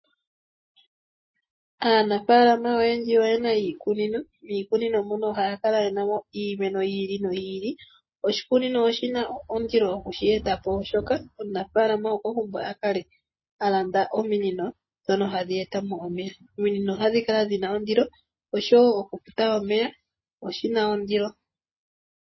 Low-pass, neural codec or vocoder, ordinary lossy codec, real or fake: 7.2 kHz; none; MP3, 24 kbps; real